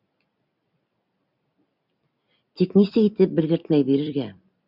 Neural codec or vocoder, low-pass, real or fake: vocoder, 44.1 kHz, 128 mel bands every 256 samples, BigVGAN v2; 5.4 kHz; fake